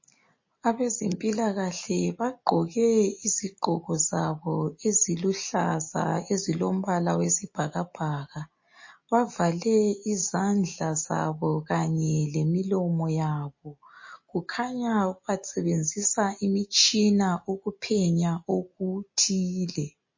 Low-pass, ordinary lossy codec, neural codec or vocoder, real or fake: 7.2 kHz; MP3, 32 kbps; none; real